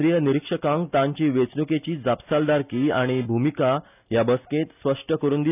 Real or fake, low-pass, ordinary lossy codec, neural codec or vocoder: real; 3.6 kHz; none; none